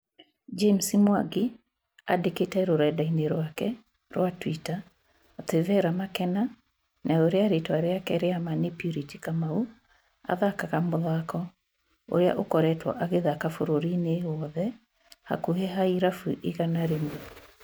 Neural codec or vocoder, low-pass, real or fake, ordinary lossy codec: none; none; real; none